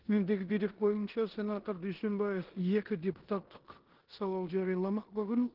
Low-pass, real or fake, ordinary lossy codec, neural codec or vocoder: 5.4 kHz; fake; Opus, 16 kbps; codec, 16 kHz in and 24 kHz out, 0.9 kbps, LongCat-Audio-Codec, four codebook decoder